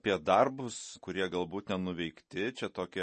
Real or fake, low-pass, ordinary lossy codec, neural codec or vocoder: real; 10.8 kHz; MP3, 32 kbps; none